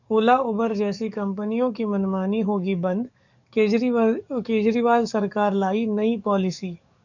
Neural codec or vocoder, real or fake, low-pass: codec, 44.1 kHz, 7.8 kbps, DAC; fake; 7.2 kHz